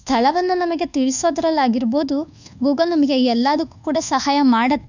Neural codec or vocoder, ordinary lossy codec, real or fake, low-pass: codec, 24 kHz, 1.2 kbps, DualCodec; none; fake; 7.2 kHz